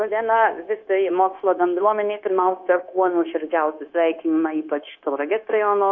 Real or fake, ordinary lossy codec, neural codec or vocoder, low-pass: fake; AAC, 48 kbps; codec, 16 kHz, 0.9 kbps, LongCat-Audio-Codec; 7.2 kHz